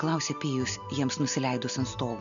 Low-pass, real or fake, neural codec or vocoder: 7.2 kHz; real; none